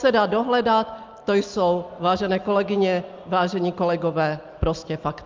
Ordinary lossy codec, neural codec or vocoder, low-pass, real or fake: Opus, 24 kbps; none; 7.2 kHz; real